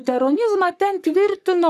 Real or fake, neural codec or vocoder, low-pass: fake; codec, 44.1 kHz, 3.4 kbps, Pupu-Codec; 14.4 kHz